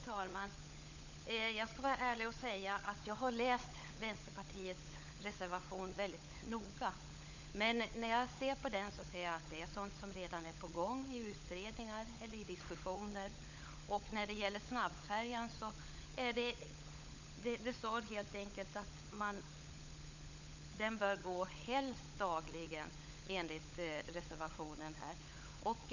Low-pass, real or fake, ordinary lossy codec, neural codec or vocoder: 7.2 kHz; fake; none; codec, 16 kHz, 16 kbps, FunCodec, trained on LibriTTS, 50 frames a second